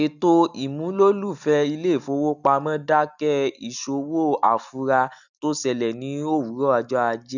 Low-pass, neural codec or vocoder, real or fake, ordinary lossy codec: 7.2 kHz; none; real; none